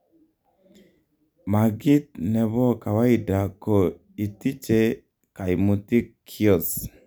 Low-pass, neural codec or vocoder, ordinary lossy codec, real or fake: none; none; none; real